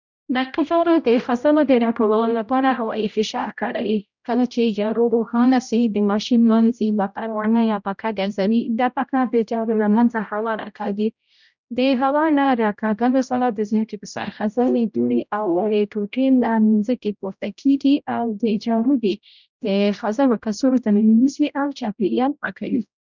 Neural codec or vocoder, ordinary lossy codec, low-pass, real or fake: codec, 16 kHz, 0.5 kbps, X-Codec, HuBERT features, trained on general audio; none; 7.2 kHz; fake